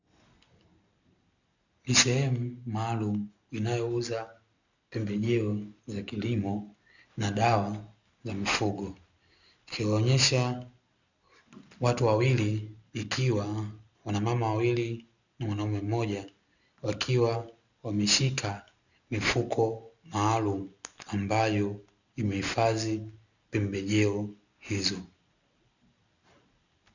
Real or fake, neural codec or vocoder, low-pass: real; none; 7.2 kHz